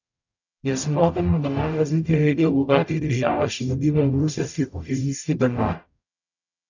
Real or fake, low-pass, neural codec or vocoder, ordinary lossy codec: fake; 7.2 kHz; codec, 44.1 kHz, 0.9 kbps, DAC; none